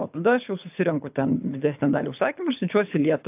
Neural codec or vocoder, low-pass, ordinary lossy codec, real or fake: codec, 24 kHz, 6 kbps, HILCodec; 3.6 kHz; AAC, 32 kbps; fake